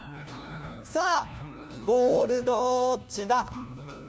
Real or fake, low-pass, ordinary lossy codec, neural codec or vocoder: fake; none; none; codec, 16 kHz, 1 kbps, FunCodec, trained on LibriTTS, 50 frames a second